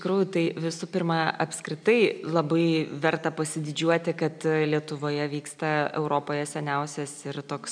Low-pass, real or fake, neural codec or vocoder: 9.9 kHz; real; none